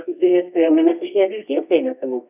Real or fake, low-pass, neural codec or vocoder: fake; 3.6 kHz; codec, 24 kHz, 0.9 kbps, WavTokenizer, medium music audio release